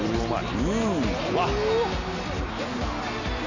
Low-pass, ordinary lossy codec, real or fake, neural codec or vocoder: 7.2 kHz; none; real; none